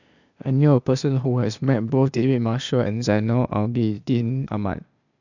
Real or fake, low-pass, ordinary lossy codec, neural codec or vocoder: fake; 7.2 kHz; none; codec, 16 kHz, 0.8 kbps, ZipCodec